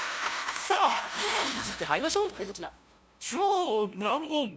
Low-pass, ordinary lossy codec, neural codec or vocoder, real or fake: none; none; codec, 16 kHz, 0.5 kbps, FunCodec, trained on LibriTTS, 25 frames a second; fake